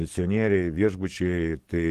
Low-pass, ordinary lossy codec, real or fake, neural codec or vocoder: 14.4 kHz; Opus, 16 kbps; fake; codec, 44.1 kHz, 7.8 kbps, DAC